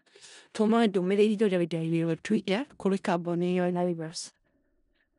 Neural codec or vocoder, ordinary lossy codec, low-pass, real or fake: codec, 16 kHz in and 24 kHz out, 0.4 kbps, LongCat-Audio-Codec, four codebook decoder; none; 10.8 kHz; fake